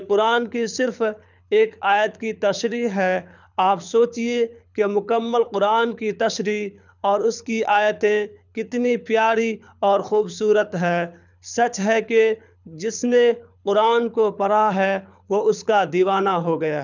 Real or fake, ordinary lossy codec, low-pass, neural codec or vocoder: fake; none; 7.2 kHz; codec, 24 kHz, 6 kbps, HILCodec